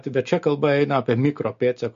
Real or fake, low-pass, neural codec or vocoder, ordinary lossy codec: real; 7.2 kHz; none; MP3, 48 kbps